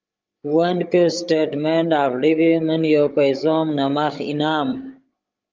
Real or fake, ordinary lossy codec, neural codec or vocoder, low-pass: fake; Opus, 32 kbps; codec, 16 kHz, 16 kbps, FreqCodec, larger model; 7.2 kHz